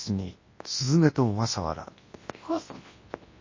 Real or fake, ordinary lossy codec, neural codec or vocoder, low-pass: fake; MP3, 32 kbps; codec, 24 kHz, 0.9 kbps, WavTokenizer, large speech release; 7.2 kHz